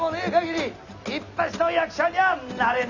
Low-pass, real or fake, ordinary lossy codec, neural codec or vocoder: 7.2 kHz; real; none; none